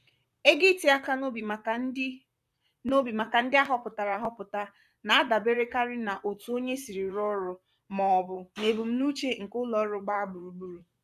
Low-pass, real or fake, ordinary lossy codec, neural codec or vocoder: 14.4 kHz; fake; none; vocoder, 44.1 kHz, 128 mel bands, Pupu-Vocoder